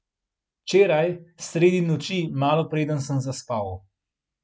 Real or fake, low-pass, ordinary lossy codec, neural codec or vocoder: real; none; none; none